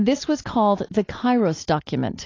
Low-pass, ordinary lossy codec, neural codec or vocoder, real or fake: 7.2 kHz; AAC, 32 kbps; none; real